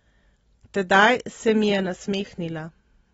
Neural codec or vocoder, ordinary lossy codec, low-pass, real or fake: none; AAC, 24 kbps; 10.8 kHz; real